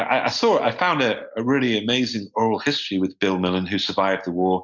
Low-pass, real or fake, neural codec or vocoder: 7.2 kHz; real; none